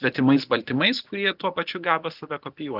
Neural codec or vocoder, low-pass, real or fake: none; 5.4 kHz; real